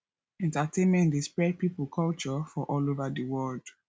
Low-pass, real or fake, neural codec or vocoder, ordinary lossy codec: none; real; none; none